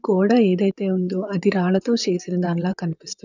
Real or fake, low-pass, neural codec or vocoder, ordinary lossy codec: fake; 7.2 kHz; vocoder, 44.1 kHz, 128 mel bands, Pupu-Vocoder; none